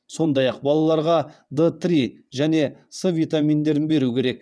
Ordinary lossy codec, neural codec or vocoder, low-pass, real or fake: none; vocoder, 22.05 kHz, 80 mel bands, WaveNeXt; none; fake